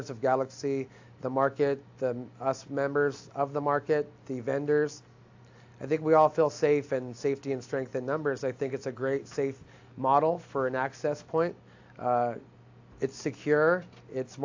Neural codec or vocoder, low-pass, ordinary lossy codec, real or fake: none; 7.2 kHz; AAC, 48 kbps; real